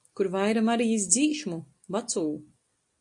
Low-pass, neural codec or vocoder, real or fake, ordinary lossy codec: 10.8 kHz; none; real; AAC, 64 kbps